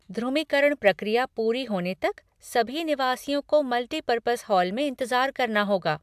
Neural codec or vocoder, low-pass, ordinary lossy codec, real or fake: none; 14.4 kHz; AAC, 96 kbps; real